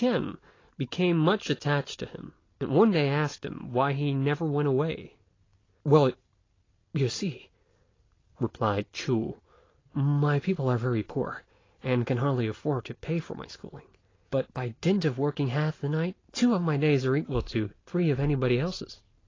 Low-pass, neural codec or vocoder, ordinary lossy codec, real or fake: 7.2 kHz; none; AAC, 32 kbps; real